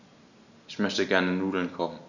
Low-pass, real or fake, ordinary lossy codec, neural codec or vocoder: 7.2 kHz; real; none; none